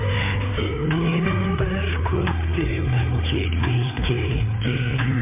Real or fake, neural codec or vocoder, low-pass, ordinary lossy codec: fake; codec, 16 kHz, 4 kbps, FreqCodec, larger model; 3.6 kHz; MP3, 32 kbps